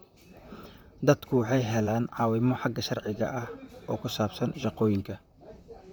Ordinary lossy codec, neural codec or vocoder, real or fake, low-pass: none; vocoder, 44.1 kHz, 128 mel bands, Pupu-Vocoder; fake; none